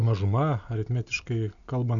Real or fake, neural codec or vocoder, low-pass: real; none; 7.2 kHz